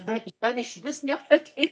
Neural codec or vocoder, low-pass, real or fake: codec, 24 kHz, 0.9 kbps, WavTokenizer, medium music audio release; 10.8 kHz; fake